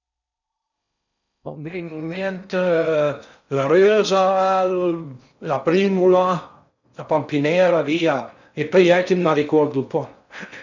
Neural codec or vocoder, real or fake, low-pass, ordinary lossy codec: codec, 16 kHz in and 24 kHz out, 0.6 kbps, FocalCodec, streaming, 4096 codes; fake; 7.2 kHz; none